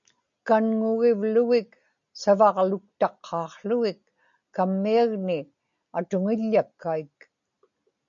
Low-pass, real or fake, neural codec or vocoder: 7.2 kHz; real; none